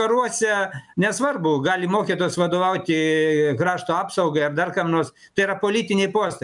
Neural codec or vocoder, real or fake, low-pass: none; real; 10.8 kHz